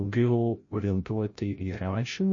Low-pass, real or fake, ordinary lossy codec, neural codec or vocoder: 7.2 kHz; fake; MP3, 32 kbps; codec, 16 kHz, 0.5 kbps, FreqCodec, larger model